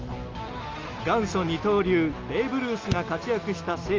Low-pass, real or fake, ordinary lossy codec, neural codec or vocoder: 7.2 kHz; real; Opus, 32 kbps; none